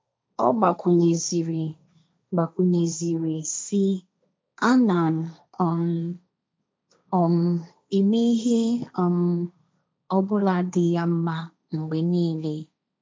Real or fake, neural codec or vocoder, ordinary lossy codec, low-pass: fake; codec, 16 kHz, 1.1 kbps, Voila-Tokenizer; none; none